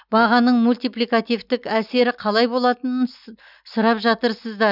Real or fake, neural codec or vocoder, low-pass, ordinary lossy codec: real; none; 5.4 kHz; none